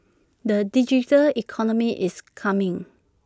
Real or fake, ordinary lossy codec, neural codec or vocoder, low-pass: real; none; none; none